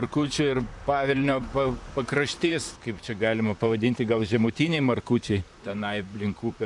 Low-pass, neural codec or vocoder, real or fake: 10.8 kHz; vocoder, 44.1 kHz, 128 mel bands, Pupu-Vocoder; fake